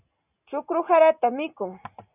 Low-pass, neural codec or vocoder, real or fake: 3.6 kHz; none; real